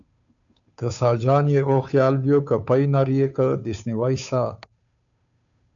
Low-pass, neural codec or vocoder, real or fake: 7.2 kHz; codec, 16 kHz, 2 kbps, FunCodec, trained on Chinese and English, 25 frames a second; fake